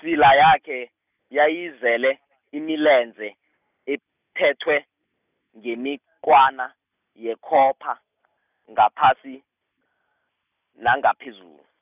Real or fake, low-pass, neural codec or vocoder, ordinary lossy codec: real; 3.6 kHz; none; none